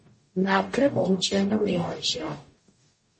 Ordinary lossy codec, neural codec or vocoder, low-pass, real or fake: MP3, 32 kbps; codec, 44.1 kHz, 0.9 kbps, DAC; 10.8 kHz; fake